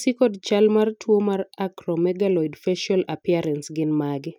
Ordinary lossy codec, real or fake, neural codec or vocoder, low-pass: none; real; none; 19.8 kHz